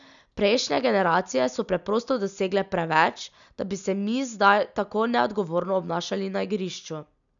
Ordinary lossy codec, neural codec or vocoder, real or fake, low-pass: none; none; real; 7.2 kHz